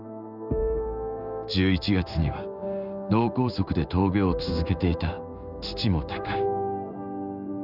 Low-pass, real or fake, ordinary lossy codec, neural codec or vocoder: 5.4 kHz; fake; none; codec, 16 kHz in and 24 kHz out, 1 kbps, XY-Tokenizer